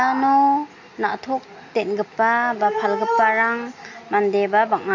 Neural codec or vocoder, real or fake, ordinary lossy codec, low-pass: none; real; MP3, 48 kbps; 7.2 kHz